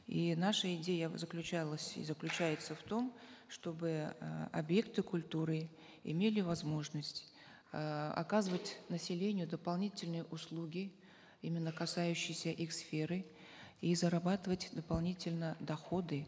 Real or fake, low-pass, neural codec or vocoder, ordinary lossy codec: real; none; none; none